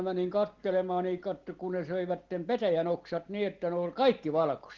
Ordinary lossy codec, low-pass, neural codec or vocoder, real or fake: Opus, 16 kbps; 7.2 kHz; none; real